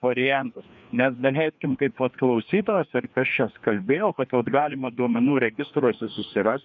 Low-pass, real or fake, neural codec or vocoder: 7.2 kHz; fake; codec, 16 kHz, 2 kbps, FreqCodec, larger model